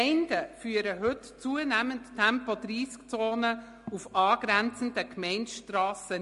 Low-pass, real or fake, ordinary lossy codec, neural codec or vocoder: 14.4 kHz; real; MP3, 48 kbps; none